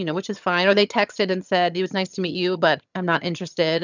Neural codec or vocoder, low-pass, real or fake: vocoder, 22.05 kHz, 80 mel bands, HiFi-GAN; 7.2 kHz; fake